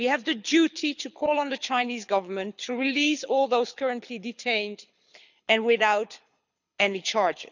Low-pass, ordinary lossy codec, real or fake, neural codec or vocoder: 7.2 kHz; none; fake; codec, 24 kHz, 6 kbps, HILCodec